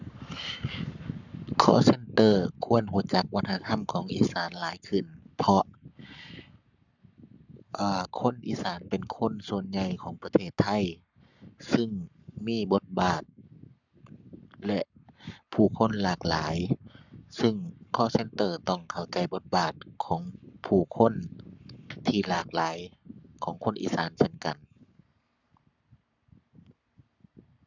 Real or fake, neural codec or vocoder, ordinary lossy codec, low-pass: fake; codec, 44.1 kHz, 7.8 kbps, Pupu-Codec; none; 7.2 kHz